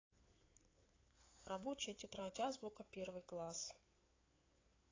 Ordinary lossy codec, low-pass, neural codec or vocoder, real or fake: AAC, 32 kbps; 7.2 kHz; codec, 16 kHz in and 24 kHz out, 2.2 kbps, FireRedTTS-2 codec; fake